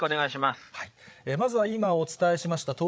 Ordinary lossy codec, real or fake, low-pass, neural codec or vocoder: none; fake; none; codec, 16 kHz, 8 kbps, FreqCodec, larger model